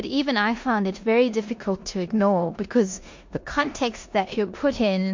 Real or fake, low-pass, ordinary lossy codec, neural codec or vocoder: fake; 7.2 kHz; MP3, 48 kbps; codec, 16 kHz in and 24 kHz out, 0.9 kbps, LongCat-Audio-Codec, four codebook decoder